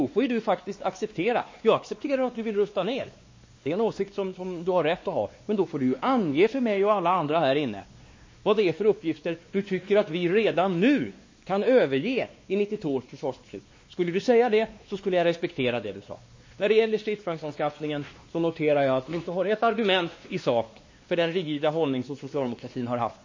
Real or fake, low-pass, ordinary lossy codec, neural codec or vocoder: fake; 7.2 kHz; MP3, 32 kbps; codec, 16 kHz, 2 kbps, X-Codec, WavLM features, trained on Multilingual LibriSpeech